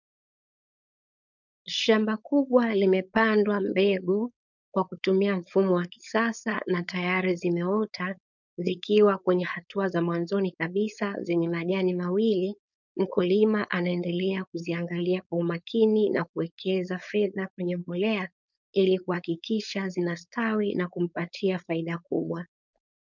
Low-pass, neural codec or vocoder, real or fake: 7.2 kHz; codec, 16 kHz, 4.8 kbps, FACodec; fake